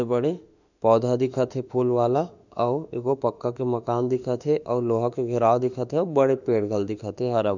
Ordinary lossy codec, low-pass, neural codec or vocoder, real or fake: none; 7.2 kHz; autoencoder, 48 kHz, 32 numbers a frame, DAC-VAE, trained on Japanese speech; fake